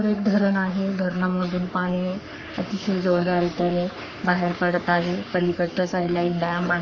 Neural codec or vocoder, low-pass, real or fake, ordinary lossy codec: codec, 44.1 kHz, 3.4 kbps, Pupu-Codec; 7.2 kHz; fake; none